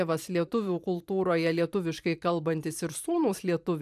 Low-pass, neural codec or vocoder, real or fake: 14.4 kHz; vocoder, 44.1 kHz, 128 mel bands every 512 samples, BigVGAN v2; fake